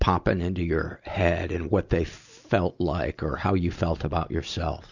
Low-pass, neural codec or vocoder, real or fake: 7.2 kHz; none; real